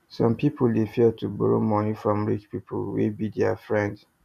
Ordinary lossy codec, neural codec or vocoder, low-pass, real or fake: none; none; 14.4 kHz; real